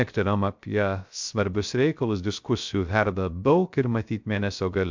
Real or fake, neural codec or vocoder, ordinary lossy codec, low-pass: fake; codec, 16 kHz, 0.3 kbps, FocalCodec; MP3, 64 kbps; 7.2 kHz